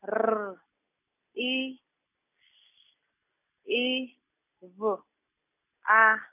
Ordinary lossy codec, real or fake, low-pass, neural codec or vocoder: none; real; 3.6 kHz; none